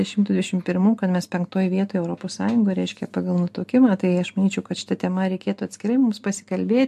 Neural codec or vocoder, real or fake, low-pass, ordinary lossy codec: none; real; 14.4 kHz; MP3, 64 kbps